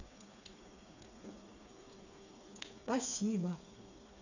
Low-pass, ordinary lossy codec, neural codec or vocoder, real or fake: 7.2 kHz; none; codec, 16 kHz, 4 kbps, FreqCodec, smaller model; fake